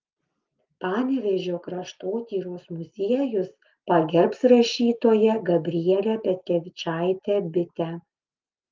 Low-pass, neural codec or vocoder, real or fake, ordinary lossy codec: 7.2 kHz; none; real; Opus, 32 kbps